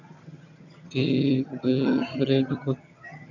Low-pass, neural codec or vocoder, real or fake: 7.2 kHz; vocoder, 22.05 kHz, 80 mel bands, HiFi-GAN; fake